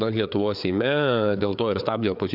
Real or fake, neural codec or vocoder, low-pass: fake; codec, 16 kHz, 4 kbps, FreqCodec, larger model; 5.4 kHz